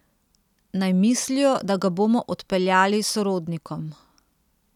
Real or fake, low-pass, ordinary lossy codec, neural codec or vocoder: real; 19.8 kHz; none; none